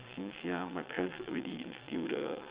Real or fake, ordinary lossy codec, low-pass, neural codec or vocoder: fake; Opus, 64 kbps; 3.6 kHz; vocoder, 22.05 kHz, 80 mel bands, WaveNeXt